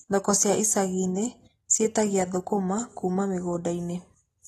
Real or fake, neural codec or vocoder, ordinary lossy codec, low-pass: real; none; AAC, 32 kbps; 19.8 kHz